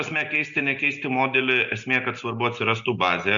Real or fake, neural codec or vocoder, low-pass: real; none; 7.2 kHz